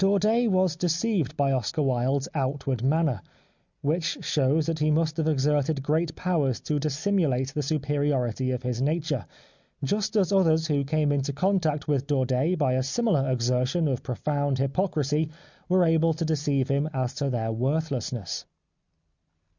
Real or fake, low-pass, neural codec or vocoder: real; 7.2 kHz; none